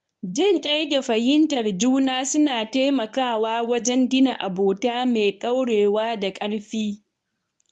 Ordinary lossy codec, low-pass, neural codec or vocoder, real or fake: none; none; codec, 24 kHz, 0.9 kbps, WavTokenizer, medium speech release version 1; fake